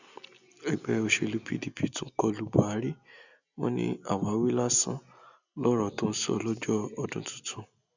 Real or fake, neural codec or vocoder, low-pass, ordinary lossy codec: real; none; 7.2 kHz; none